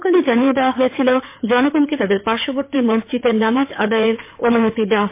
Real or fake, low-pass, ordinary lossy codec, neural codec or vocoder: fake; 3.6 kHz; MP3, 24 kbps; codec, 16 kHz in and 24 kHz out, 2.2 kbps, FireRedTTS-2 codec